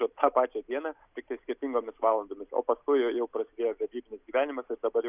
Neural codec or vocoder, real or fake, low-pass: none; real; 3.6 kHz